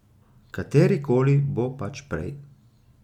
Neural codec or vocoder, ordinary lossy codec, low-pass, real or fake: none; MP3, 96 kbps; 19.8 kHz; real